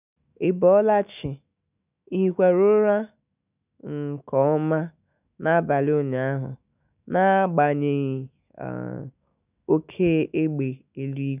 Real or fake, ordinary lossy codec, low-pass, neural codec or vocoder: fake; none; 3.6 kHz; autoencoder, 48 kHz, 128 numbers a frame, DAC-VAE, trained on Japanese speech